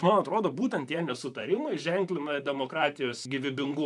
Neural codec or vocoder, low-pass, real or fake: vocoder, 44.1 kHz, 128 mel bands, Pupu-Vocoder; 10.8 kHz; fake